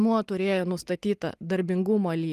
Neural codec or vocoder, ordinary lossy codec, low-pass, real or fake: none; Opus, 32 kbps; 14.4 kHz; real